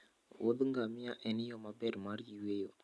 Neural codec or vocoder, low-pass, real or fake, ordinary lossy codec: none; none; real; none